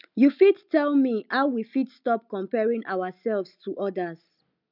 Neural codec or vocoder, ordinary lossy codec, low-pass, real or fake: none; none; 5.4 kHz; real